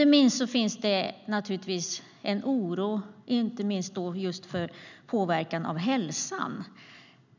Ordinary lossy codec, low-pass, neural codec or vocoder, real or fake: none; 7.2 kHz; none; real